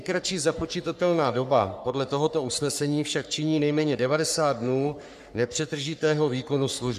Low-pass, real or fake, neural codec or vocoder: 14.4 kHz; fake; codec, 44.1 kHz, 3.4 kbps, Pupu-Codec